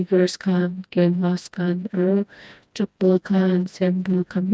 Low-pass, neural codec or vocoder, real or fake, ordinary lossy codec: none; codec, 16 kHz, 1 kbps, FreqCodec, smaller model; fake; none